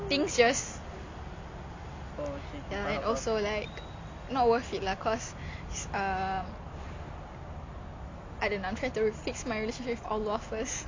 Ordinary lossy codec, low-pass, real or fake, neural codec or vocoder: MP3, 48 kbps; 7.2 kHz; real; none